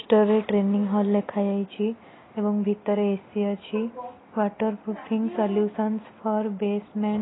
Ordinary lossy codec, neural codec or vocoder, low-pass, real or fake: AAC, 16 kbps; none; 7.2 kHz; real